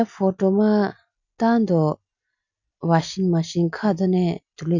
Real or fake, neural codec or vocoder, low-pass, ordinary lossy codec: real; none; 7.2 kHz; none